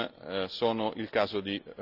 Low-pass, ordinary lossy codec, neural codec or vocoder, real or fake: 5.4 kHz; none; none; real